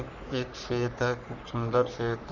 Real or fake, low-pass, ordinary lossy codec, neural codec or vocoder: fake; 7.2 kHz; none; codec, 44.1 kHz, 3.4 kbps, Pupu-Codec